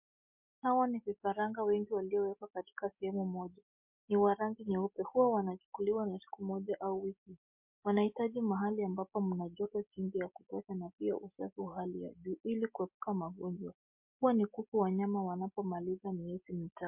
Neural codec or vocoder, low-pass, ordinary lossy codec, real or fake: none; 3.6 kHz; MP3, 32 kbps; real